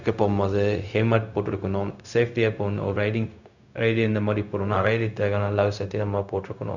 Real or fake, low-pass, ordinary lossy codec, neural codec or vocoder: fake; 7.2 kHz; none; codec, 16 kHz, 0.4 kbps, LongCat-Audio-Codec